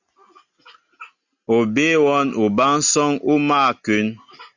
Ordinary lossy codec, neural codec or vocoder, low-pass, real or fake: Opus, 64 kbps; none; 7.2 kHz; real